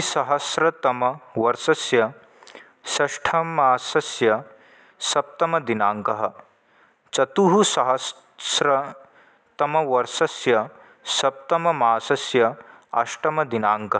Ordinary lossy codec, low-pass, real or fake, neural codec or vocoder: none; none; real; none